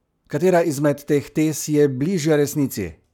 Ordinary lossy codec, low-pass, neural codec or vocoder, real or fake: none; 19.8 kHz; codec, 44.1 kHz, 7.8 kbps, Pupu-Codec; fake